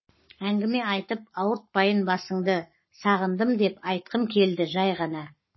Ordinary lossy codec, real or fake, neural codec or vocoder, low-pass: MP3, 24 kbps; fake; codec, 44.1 kHz, 7.8 kbps, Pupu-Codec; 7.2 kHz